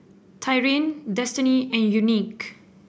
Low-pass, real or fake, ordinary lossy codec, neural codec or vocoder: none; real; none; none